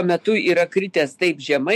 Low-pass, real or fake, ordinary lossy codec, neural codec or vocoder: 14.4 kHz; fake; MP3, 64 kbps; autoencoder, 48 kHz, 128 numbers a frame, DAC-VAE, trained on Japanese speech